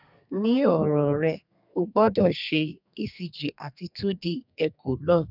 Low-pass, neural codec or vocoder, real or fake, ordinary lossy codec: 5.4 kHz; codec, 32 kHz, 1.9 kbps, SNAC; fake; none